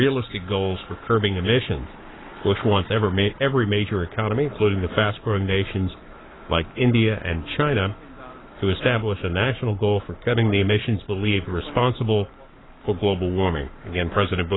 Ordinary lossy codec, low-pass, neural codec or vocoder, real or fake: AAC, 16 kbps; 7.2 kHz; codec, 44.1 kHz, 7.8 kbps, Pupu-Codec; fake